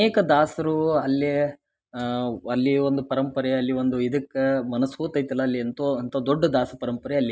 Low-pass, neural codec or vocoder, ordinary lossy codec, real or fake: none; none; none; real